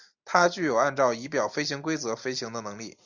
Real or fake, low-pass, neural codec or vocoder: real; 7.2 kHz; none